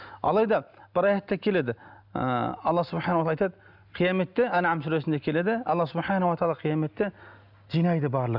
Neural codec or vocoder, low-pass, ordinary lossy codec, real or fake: none; 5.4 kHz; none; real